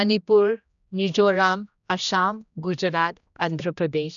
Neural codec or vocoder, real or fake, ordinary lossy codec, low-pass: codec, 16 kHz, 1 kbps, X-Codec, HuBERT features, trained on general audio; fake; none; 7.2 kHz